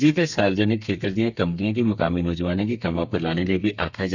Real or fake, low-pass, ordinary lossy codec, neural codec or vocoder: fake; 7.2 kHz; none; codec, 32 kHz, 1.9 kbps, SNAC